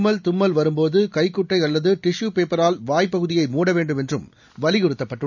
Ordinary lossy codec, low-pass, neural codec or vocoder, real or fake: none; 7.2 kHz; none; real